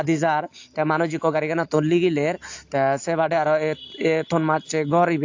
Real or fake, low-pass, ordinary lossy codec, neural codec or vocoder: real; 7.2 kHz; AAC, 48 kbps; none